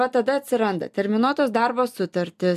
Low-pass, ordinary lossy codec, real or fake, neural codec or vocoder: 14.4 kHz; MP3, 96 kbps; real; none